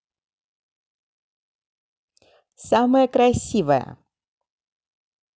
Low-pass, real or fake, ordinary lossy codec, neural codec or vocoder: none; real; none; none